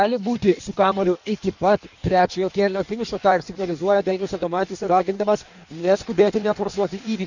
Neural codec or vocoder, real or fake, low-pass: codec, 16 kHz in and 24 kHz out, 1.1 kbps, FireRedTTS-2 codec; fake; 7.2 kHz